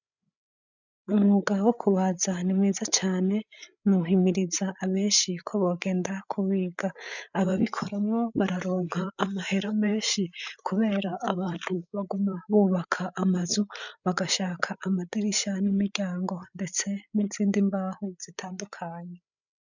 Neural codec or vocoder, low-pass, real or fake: codec, 16 kHz, 8 kbps, FreqCodec, larger model; 7.2 kHz; fake